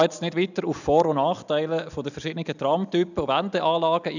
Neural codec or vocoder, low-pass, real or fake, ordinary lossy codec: none; 7.2 kHz; real; none